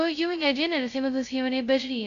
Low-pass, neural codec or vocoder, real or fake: 7.2 kHz; codec, 16 kHz, 0.2 kbps, FocalCodec; fake